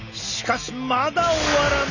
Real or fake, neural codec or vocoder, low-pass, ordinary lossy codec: real; none; 7.2 kHz; none